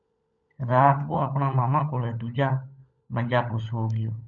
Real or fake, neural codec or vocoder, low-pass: fake; codec, 16 kHz, 16 kbps, FunCodec, trained on LibriTTS, 50 frames a second; 7.2 kHz